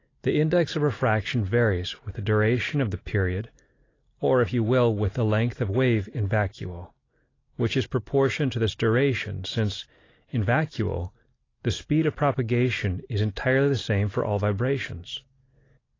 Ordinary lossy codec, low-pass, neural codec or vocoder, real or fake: AAC, 32 kbps; 7.2 kHz; none; real